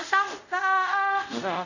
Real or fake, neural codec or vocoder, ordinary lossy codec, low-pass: fake; codec, 24 kHz, 0.5 kbps, DualCodec; none; 7.2 kHz